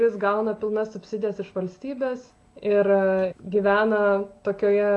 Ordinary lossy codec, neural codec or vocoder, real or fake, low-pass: AAC, 48 kbps; none; real; 10.8 kHz